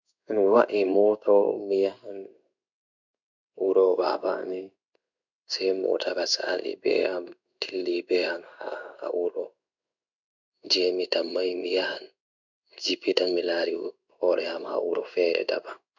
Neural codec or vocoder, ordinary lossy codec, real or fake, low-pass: codec, 16 kHz in and 24 kHz out, 1 kbps, XY-Tokenizer; none; fake; 7.2 kHz